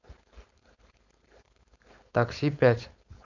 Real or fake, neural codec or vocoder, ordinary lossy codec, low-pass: fake; codec, 16 kHz, 4.8 kbps, FACodec; none; 7.2 kHz